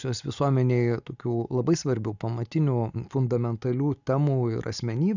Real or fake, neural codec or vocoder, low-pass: real; none; 7.2 kHz